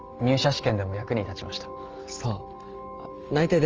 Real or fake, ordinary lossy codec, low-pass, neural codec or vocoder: real; Opus, 24 kbps; 7.2 kHz; none